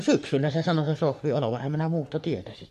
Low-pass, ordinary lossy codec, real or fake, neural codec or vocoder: 14.4 kHz; MP3, 64 kbps; fake; codec, 44.1 kHz, 3.4 kbps, Pupu-Codec